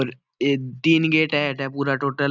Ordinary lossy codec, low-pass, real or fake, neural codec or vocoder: none; 7.2 kHz; real; none